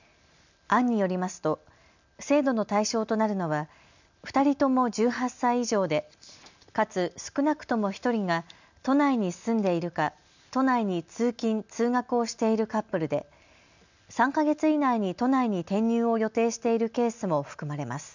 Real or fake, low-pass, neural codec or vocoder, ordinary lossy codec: real; 7.2 kHz; none; none